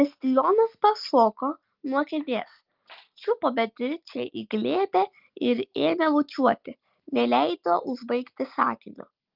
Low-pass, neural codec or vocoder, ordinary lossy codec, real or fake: 5.4 kHz; none; Opus, 24 kbps; real